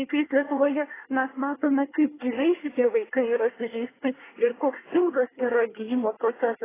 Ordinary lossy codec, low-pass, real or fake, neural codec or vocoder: AAC, 16 kbps; 3.6 kHz; fake; codec, 24 kHz, 1 kbps, SNAC